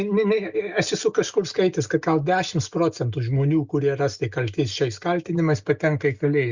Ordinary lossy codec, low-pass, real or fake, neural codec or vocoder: Opus, 64 kbps; 7.2 kHz; real; none